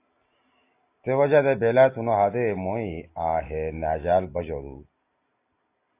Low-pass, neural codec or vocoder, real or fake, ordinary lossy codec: 3.6 kHz; none; real; AAC, 24 kbps